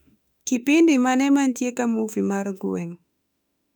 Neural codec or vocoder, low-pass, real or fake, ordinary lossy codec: autoencoder, 48 kHz, 32 numbers a frame, DAC-VAE, trained on Japanese speech; 19.8 kHz; fake; none